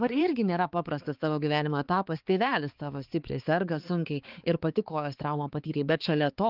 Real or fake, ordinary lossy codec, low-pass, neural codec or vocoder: fake; Opus, 32 kbps; 5.4 kHz; codec, 16 kHz, 4 kbps, X-Codec, HuBERT features, trained on balanced general audio